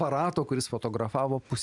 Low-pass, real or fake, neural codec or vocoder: 10.8 kHz; real; none